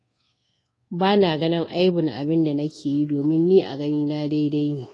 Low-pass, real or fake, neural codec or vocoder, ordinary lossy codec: 10.8 kHz; fake; codec, 24 kHz, 1.2 kbps, DualCodec; AAC, 32 kbps